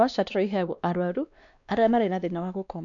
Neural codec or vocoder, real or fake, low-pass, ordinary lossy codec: codec, 16 kHz, 2 kbps, X-Codec, WavLM features, trained on Multilingual LibriSpeech; fake; 7.2 kHz; none